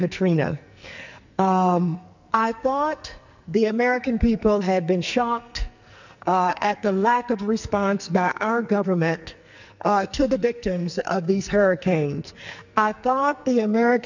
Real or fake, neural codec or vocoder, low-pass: fake; codec, 44.1 kHz, 2.6 kbps, SNAC; 7.2 kHz